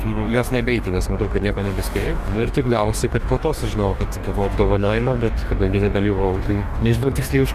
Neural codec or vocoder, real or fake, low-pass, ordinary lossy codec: codec, 44.1 kHz, 2.6 kbps, DAC; fake; 14.4 kHz; Opus, 64 kbps